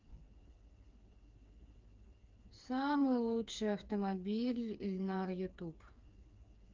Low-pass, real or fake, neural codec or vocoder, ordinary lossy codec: 7.2 kHz; fake; codec, 16 kHz, 4 kbps, FreqCodec, smaller model; Opus, 24 kbps